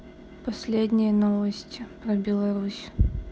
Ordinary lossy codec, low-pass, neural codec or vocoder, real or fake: none; none; none; real